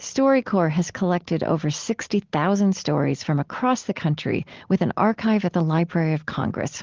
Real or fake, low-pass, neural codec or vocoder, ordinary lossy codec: real; 7.2 kHz; none; Opus, 16 kbps